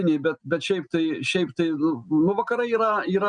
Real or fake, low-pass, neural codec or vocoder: real; 9.9 kHz; none